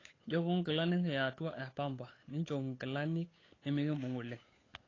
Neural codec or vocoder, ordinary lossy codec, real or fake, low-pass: codec, 16 kHz, 8 kbps, FunCodec, trained on Chinese and English, 25 frames a second; AAC, 32 kbps; fake; 7.2 kHz